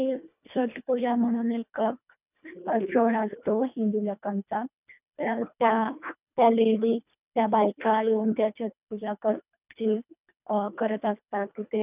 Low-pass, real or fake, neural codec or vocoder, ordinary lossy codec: 3.6 kHz; fake; codec, 24 kHz, 1.5 kbps, HILCodec; none